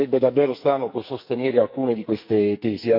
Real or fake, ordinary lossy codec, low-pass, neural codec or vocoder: fake; none; 5.4 kHz; codec, 44.1 kHz, 2.6 kbps, SNAC